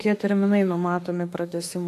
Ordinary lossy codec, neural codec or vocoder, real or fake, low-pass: AAC, 64 kbps; autoencoder, 48 kHz, 32 numbers a frame, DAC-VAE, trained on Japanese speech; fake; 14.4 kHz